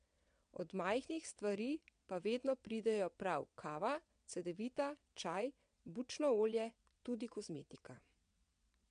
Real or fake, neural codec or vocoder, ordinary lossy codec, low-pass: fake; vocoder, 22.05 kHz, 80 mel bands, WaveNeXt; MP3, 64 kbps; 9.9 kHz